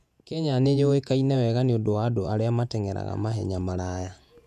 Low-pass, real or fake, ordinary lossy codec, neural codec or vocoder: 14.4 kHz; fake; none; vocoder, 48 kHz, 128 mel bands, Vocos